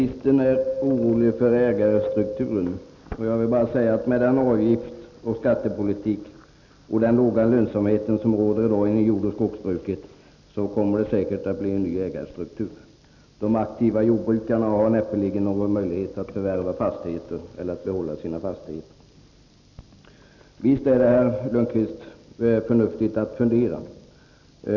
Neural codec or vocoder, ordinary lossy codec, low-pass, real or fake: none; none; 7.2 kHz; real